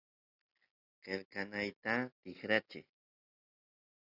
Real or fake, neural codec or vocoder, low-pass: real; none; 5.4 kHz